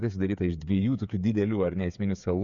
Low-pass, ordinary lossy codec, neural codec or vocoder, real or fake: 7.2 kHz; MP3, 64 kbps; codec, 16 kHz, 8 kbps, FreqCodec, smaller model; fake